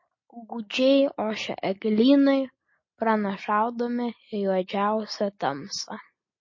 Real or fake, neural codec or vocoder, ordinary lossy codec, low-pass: real; none; MP3, 32 kbps; 7.2 kHz